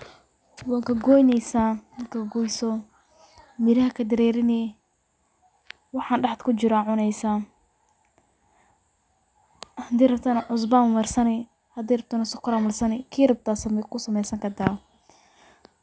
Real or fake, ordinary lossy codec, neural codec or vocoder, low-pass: real; none; none; none